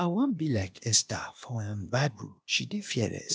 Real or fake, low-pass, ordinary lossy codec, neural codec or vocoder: fake; none; none; codec, 16 kHz, 0.8 kbps, ZipCodec